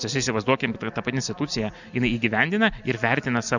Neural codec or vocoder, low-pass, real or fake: none; 7.2 kHz; real